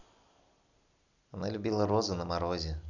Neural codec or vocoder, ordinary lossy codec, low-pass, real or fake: none; none; 7.2 kHz; real